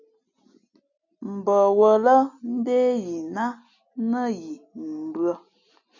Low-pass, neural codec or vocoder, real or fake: 7.2 kHz; none; real